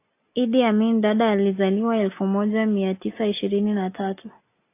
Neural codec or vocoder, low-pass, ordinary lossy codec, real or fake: none; 3.6 kHz; AAC, 24 kbps; real